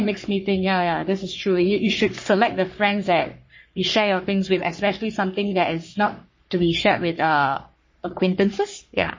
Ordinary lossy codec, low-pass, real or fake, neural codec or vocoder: MP3, 32 kbps; 7.2 kHz; fake; codec, 44.1 kHz, 3.4 kbps, Pupu-Codec